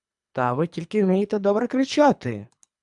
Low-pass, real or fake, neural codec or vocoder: 10.8 kHz; fake; codec, 24 kHz, 3 kbps, HILCodec